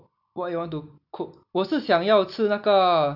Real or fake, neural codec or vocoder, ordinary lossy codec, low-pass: real; none; none; 5.4 kHz